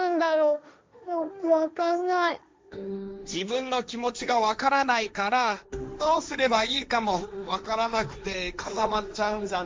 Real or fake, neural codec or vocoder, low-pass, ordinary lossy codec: fake; codec, 16 kHz, 1.1 kbps, Voila-Tokenizer; none; none